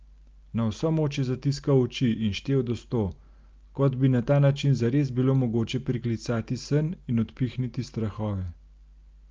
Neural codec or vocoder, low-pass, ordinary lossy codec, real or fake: none; 7.2 kHz; Opus, 24 kbps; real